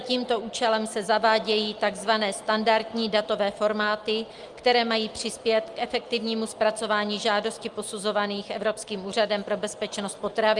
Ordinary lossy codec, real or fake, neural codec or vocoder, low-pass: Opus, 32 kbps; real; none; 10.8 kHz